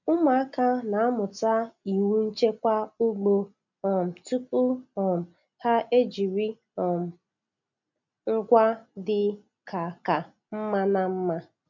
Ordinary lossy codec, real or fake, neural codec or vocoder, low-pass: none; real; none; 7.2 kHz